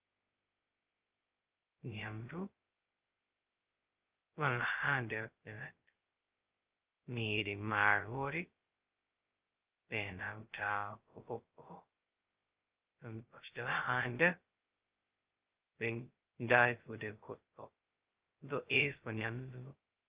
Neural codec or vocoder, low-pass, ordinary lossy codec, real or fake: codec, 16 kHz, 0.2 kbps, FocalCodec; 3.6 kHz; Opus, 24 kbps; fake